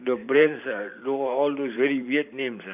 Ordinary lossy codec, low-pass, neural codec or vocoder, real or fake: none; 3.6 kHz; vocoder, 44.1 kHz, 128 mel bands every 512 samples, BigVGAN v2; fake